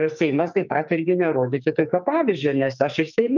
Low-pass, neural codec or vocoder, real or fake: 7.2 kHz; codec, 44.1 kHz, 2.6 kbps, SNAC; fake